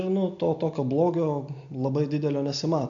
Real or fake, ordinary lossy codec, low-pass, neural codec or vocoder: real; MP3, 64 kbps; 7.2 kHz; none